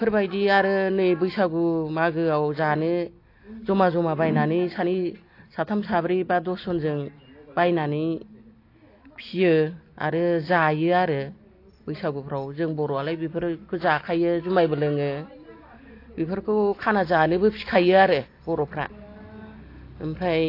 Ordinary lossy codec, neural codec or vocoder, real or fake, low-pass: AAC, 32 kbps; none; real; 5.4 kHz